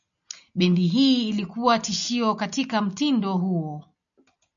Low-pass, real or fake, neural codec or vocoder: 7.2 kHz; real; none